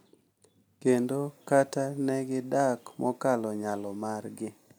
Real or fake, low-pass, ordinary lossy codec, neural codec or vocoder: real; none; none; none